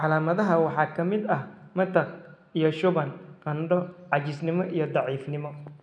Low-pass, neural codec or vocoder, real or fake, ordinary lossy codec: 9.9 kHz; none; real; none